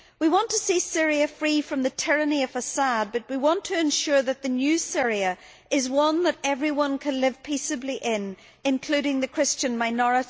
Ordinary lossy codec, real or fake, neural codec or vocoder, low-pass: none; real; none; none